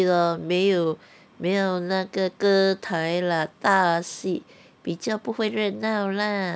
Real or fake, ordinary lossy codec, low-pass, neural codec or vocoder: real; none; none; none